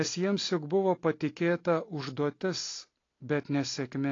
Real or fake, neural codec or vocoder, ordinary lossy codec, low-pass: real; none; AAC, 32 kbps; 7.2 kHz